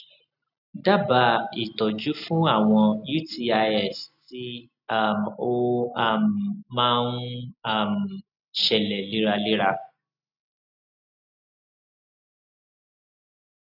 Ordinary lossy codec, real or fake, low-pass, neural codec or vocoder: none; real; 5.4 kHz; none